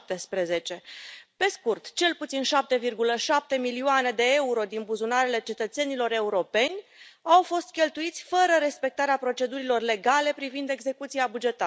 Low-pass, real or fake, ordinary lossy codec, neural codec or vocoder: none; real; none; none